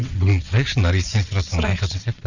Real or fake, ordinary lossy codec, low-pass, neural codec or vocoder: fake; none; 7.2 kHz; vocoder, 22.05 kHz, 80 mel bands, WaveNeXt